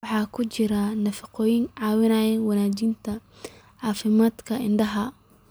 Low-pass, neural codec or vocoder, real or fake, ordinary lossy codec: none; none; real; none